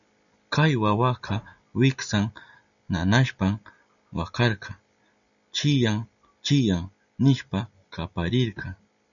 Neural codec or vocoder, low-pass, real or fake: none; 7.2 kHz; real